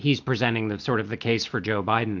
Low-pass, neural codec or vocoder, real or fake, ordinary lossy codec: 7.2 kHz; none; real; MP3, 64 kbps